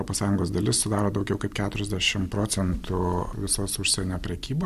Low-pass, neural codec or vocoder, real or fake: 14.4 kHz; none; real